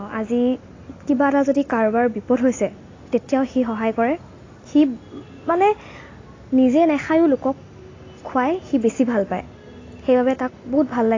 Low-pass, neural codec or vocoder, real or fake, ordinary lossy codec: 7.2 kHz; none; real; AAC, 32 kbps